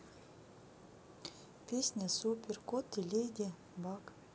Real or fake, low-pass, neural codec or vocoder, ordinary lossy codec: real; none; none; none